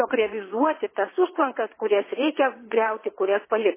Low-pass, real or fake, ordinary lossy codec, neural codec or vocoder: 3.6 kHz; fake; MP3, 16 kbps; vocoder, 22.05 kHz, 80 mel bands, WaveNeXt